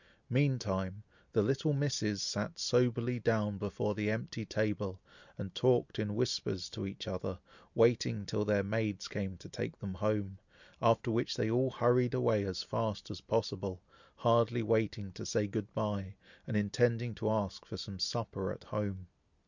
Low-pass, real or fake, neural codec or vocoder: 7.2 kHz; real; none